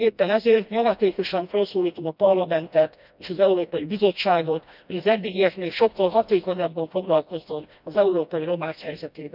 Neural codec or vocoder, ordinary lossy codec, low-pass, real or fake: codec, 16 kHz, 1 kbps, FreqCodec, smaller model; none; 5.4 kHz; fake